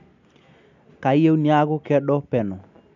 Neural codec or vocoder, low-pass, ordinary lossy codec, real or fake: none; 7.2 kHz; none; real